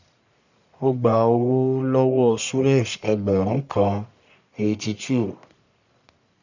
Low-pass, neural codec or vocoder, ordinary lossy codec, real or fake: 7.2 kHz; codec, 44.1 kHz, 1.7 kbps, Pupu-Codec; none; fake